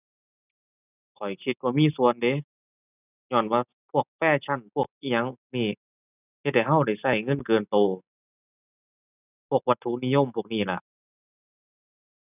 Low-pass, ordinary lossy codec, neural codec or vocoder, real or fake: 3.6 kHz; none; none; real